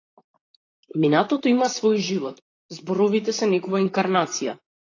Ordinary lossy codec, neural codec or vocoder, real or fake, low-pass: AAC, 32 kbps; vocoder, 44.1 kHz, 128 mel bands, Pupu-Vocoder; fake; 7.2 kHz